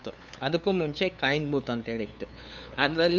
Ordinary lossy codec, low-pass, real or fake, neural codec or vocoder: none; none; fake; codec, 16 kHz, 2 kbps, FunCodec, trained on LibriTTS, 25 frames a second